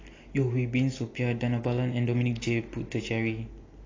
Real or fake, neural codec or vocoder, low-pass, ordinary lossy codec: real; none; 7.2 kHz; AAC, 32 kbps